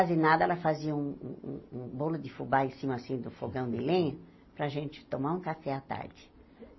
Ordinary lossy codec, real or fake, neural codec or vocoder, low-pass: MP3, 24 kbps; real; none; 7.2 kHz